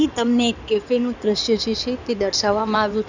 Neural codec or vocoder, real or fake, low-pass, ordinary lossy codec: codec, 16 kHz in and 24 kHz out, 2.2 kbps, FireRedTTS-2 codec; fake; 7.2 kHz; none